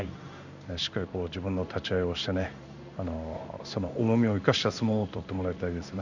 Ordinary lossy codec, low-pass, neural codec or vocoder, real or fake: none; 7.2 kHz; codec, 16 kHz in and 24 kHz out, 1 kbps, XY-Tokenizer; fake